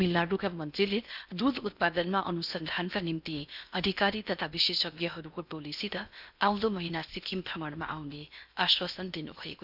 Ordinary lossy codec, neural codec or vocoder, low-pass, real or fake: none; codec, 16 kHz in and 24 kHz out, 0.8 kbps, FocalCodec, streaming, 65536 codes; 5.4 kHz; fake